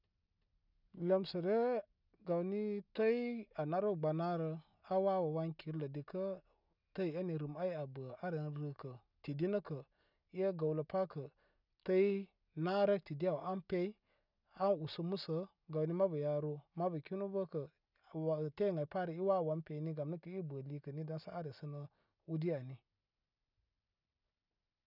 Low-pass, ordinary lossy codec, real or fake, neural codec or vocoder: 5.4 kHz; none; real; none